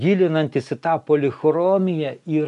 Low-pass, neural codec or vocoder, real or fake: 10.8 kHz; none; real